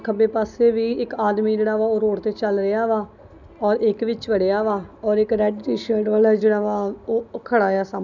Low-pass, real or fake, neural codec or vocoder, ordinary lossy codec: 7.2 kHz; real; none; none